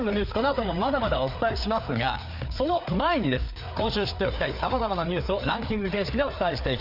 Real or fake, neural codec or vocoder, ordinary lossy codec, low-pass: fake; codec, 16 kHz, 4 kbps, FreqCodec, larger model; none; 5.4 kHz